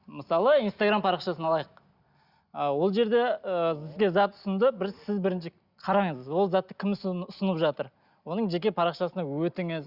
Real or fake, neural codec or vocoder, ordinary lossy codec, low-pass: real; none; Opus, 64 kbps; 5.4 kHz